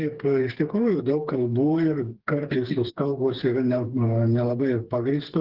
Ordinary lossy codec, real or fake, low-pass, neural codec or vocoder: Opus, 16 kbps; fake; 5.4 kHz; codec, 16 kHz, 4 kbps, FreqCodec, smaller model